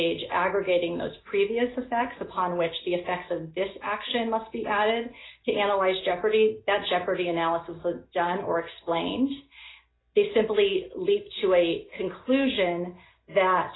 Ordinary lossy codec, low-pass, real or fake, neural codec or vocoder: AAC, 16 kbps; 7.2 kHz; real; none